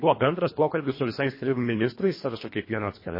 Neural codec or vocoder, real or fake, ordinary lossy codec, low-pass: codec, 24 kHz, 1.5 kbps, HILCodec; fake; MP3, 24 kbps; 5.4 kHz